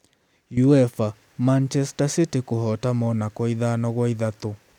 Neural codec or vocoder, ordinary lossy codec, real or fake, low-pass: none; none; real; 19.8 kHz